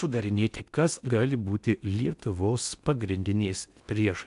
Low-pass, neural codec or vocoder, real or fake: 10.8 kHz; codec, 16 kHz in and 24 kHz out, 0.6 kbps, FocalCodec, streaming, 4096 codes; fake